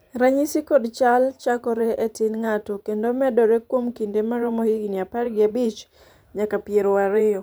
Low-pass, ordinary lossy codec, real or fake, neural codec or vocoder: none; none; fake; vocoder, 44.1 kHz, 128 mel bands every 512 samples, BigVGAN v2